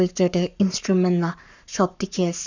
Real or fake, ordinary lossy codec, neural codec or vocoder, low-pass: fake; none; codec, 44.1 kHz, 7.8 kbps, Pupu-Codec; 7.2 kHz